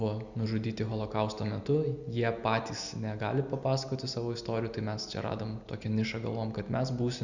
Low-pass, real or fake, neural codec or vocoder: 7.2 kHz; real; none